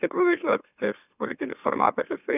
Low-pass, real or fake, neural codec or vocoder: 3.6 kHz; fake; autoencoder, 44.1 kHz, a latent of 192 numbers a frame, MeloTTS